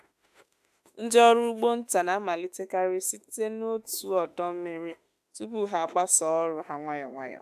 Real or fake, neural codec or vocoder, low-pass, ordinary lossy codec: fake; autoencoder, 48 kHz, 32 numbers a frame, DAC-VAE, trained on Japanese speech; 14.4 kHz; AAC, 96 kbps